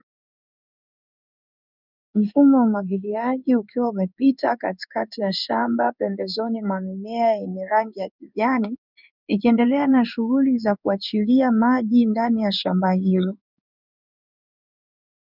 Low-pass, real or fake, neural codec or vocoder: 5.4 kHz; fake; codec, 16 kHz in and 24 kHz out, 1 kbps, XY-Tokenizer